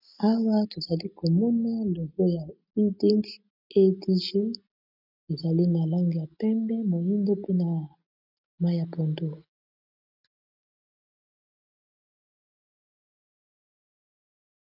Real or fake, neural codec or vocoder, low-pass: real; none; 5.4 kHz